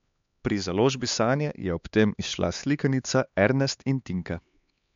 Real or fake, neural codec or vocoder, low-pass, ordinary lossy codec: fake; codec, 16 kHz, 4 kbps, X-Codec, HuBERT features, trained on LibriSpeech; 7.2 kHz; MP3, 64 kbps